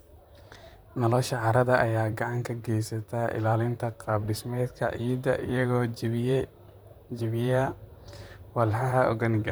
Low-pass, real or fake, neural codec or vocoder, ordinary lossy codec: none; fake; vocoder, 44.1 kHz, 128 mel bands, Pupu-Vocoder; none